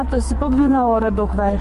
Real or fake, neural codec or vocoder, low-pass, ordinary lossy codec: fake; codec, 44.1 kHz, 2.6 kbps, SNAC; 14.4 kHz; MP3, 48 kbps